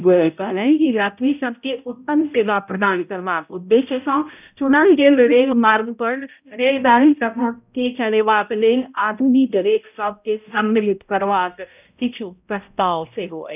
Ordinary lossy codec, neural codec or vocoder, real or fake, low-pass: none; codec, 16 kHz, 0.5 kbps, X-Codec, HuBERT features, trained on balanced general audio; fake; 3.6 kHz